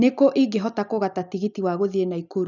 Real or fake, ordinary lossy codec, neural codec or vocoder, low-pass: real; none; none; 7.2 kHz